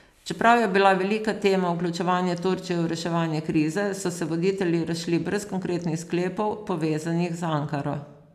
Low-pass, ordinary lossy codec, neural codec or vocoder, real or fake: 14.4 kHz; none; none; real